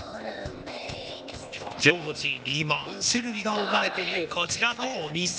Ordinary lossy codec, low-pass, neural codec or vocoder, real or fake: none; none; codec, 16 kHz, 0.8 kbps, ZipCodec; fake